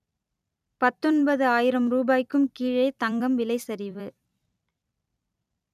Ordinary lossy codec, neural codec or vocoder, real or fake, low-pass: none; vocoder, 44.1 kHz, 128 mel bands every 256 samples, BigVGAN v2; fake; 14.4 kHz